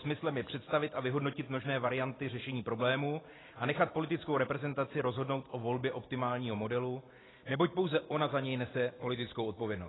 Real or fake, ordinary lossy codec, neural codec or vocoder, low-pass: real; AAC, 16 kbps; none; 7.2 kHz